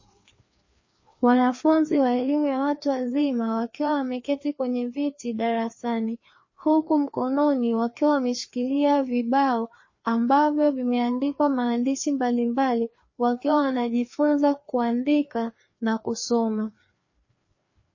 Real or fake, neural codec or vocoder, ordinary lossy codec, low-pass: fake; codec, 16 kHz, 2 kbps, FreqCodec, larger model; MP3, 32 kbps; 7.2 kHz